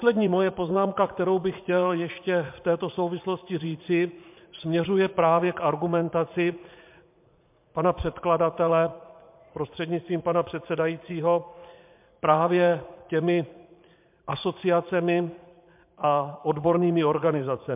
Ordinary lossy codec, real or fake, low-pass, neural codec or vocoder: MP3, 32 kbps; fake; 3.6 kHz; vocoder, 44.1 kHz, 80 mel bands, Vocos